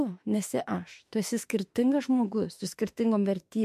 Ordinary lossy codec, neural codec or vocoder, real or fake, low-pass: MP3, 64 kbps; autoencoder, 48 kHz, 32 numbers a frame, DAC-VAE, trained on Japanese speech; fake; 14.4 kHz